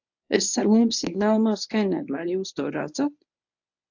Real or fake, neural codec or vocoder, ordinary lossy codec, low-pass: fake; codec, 24 kHz, 0.9 kbps, WavTokenizer, medium speech release version 1; AAC, 48 kbps; 7.2 kHz